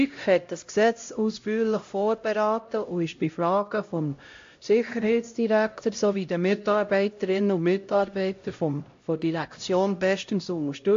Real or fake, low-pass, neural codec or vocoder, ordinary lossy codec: fake; 7.2 kHz; codec, 16 kHz, 0.5 kbps, X-Codec, HuBERT features, trained on LibriSpeech; AAC, 48 kbps